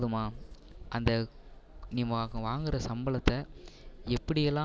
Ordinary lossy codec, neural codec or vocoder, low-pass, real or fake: none; none; none; real